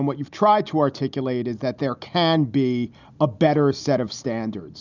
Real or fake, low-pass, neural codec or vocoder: real; 7.2 kHz; none